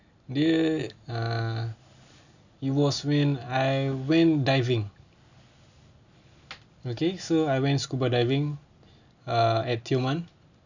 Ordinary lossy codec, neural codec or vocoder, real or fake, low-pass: none; none; real; 7.2 kHz